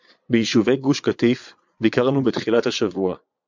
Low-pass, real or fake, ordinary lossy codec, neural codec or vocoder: 7.2 kHz; fake; MP3, 64 kbps; vocoder, 22.05 kHz, 80 mel bands, Vocos